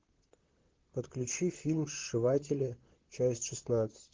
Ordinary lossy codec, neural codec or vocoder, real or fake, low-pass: Opus, 16 kbps; vocoder, 44.1 kHz, 128 mel bands, Pupu-Vocoder; fake; 7.2 kHz